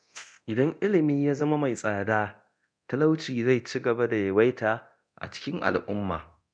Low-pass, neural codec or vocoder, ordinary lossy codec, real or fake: 9.9 kHz; codec, 24 kHz, 0.9 kbps, DualCodec; none; fake